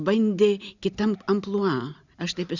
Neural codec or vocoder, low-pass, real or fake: none; 7.2 kHz; real